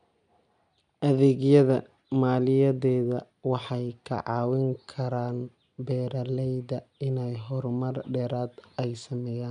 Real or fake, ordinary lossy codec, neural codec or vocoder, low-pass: real; none; none; 9.9 kHz